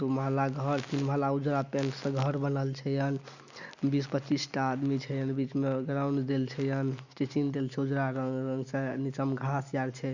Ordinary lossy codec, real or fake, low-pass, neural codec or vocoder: none; real; 7.2 kHz; none